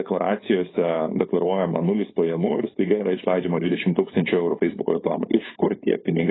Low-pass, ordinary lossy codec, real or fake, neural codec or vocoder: 7.2 kHz; AAC, 16 kbps; fake; codec, 16 kHz, 4.8 kbps, FACodec